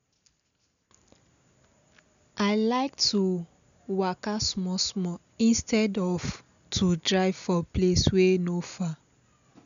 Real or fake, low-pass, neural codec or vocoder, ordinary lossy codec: real; 7.2 kHz; none; none